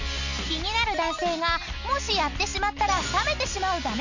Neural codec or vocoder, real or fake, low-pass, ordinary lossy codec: none; real; 7.2 kHz; none